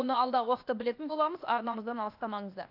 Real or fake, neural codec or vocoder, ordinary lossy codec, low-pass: fake; codec, 16 kHz, 0.8 kbps, ZipCodec; AAC, 48 kbps; 5.4 kHz